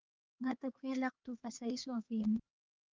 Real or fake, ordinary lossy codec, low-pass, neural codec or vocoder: fake; Opus, 32 kbps; 7.2 kHz; codec, 16 kHz, 2 kbps, X-Codec, HuBERT features, trained on LibriSpeech